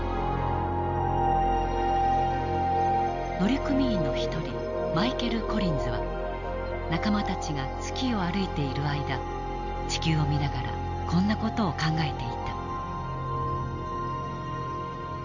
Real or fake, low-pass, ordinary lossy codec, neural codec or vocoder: real; 7.2 kHz; Opus, 64 kbps; none